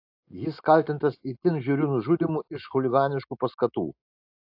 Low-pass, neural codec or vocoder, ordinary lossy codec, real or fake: 5.4 kHz; none; AAC, 48 kbps; real